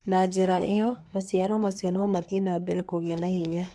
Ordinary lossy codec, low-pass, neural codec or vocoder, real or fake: none; none; codec, 24 kHz, 1 kbps, SNAC; fake